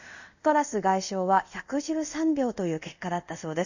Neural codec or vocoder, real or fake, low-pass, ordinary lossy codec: codec, 24 kHz, 0.5 kbps, DualCodec; fake; 7.2 kHz; none